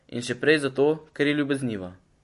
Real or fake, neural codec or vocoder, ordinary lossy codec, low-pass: real; none; MP3, 48 kbps; 14.4 kHz